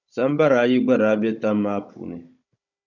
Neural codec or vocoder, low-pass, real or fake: codec, 16 kHz, 16 kbps, FunCodec, trained on Chinese and English, 50 frames a second; 7.2 kHz; fake